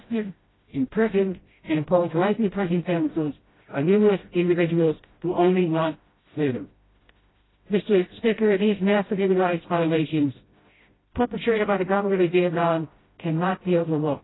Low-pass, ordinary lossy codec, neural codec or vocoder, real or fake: 7.2 kHz; AAC, 16 kbps; codec, 16 kHz, 0.5 kbps, FreqCodec, smaller model; fake